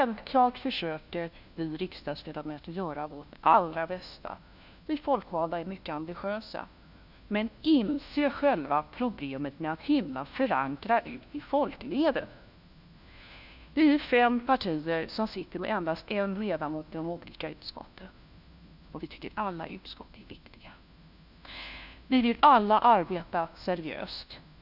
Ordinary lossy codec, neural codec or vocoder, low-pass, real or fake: none; codec, 16 kHz, 1 kbps, FunCodec, trained on LibriTTS, 50 frames a second; 5.4 kHz; fake